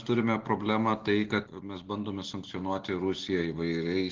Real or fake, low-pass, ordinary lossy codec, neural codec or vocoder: real; 7.2 kHz; Opus, 16 kbps; none